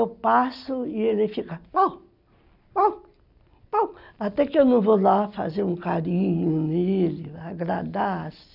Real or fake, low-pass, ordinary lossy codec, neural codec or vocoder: real; 5.4 kHz; none; none